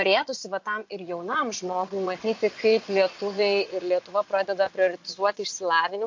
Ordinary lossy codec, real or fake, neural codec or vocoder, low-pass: MP3, 48 kbps; fake; vocoder, 22.05 kHz, 80 mel bands, Vocos; 7.2 kHz